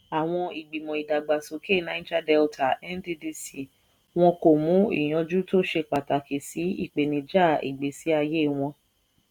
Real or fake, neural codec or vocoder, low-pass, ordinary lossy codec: real; none; 19.8 kHz; MP3, 96 kbps